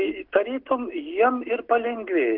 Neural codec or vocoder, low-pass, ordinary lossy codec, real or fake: none; 5.4 kHz; Opus, 16 kbps; real